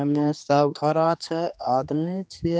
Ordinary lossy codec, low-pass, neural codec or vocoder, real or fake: none; none; codec, 16 kHz, 2 kbps, X-Codec, HuBERT features, trained on general audio; fake